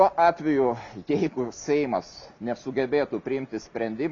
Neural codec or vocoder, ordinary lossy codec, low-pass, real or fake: none; MP3, 96 kbps; 7.2 kHz; real